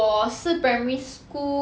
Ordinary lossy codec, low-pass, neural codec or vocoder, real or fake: none; none; none; real